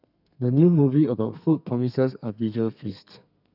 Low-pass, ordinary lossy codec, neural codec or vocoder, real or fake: 5.4 kHz; none; codec, 32 kHz, 1.9 kbps, SNAC; fake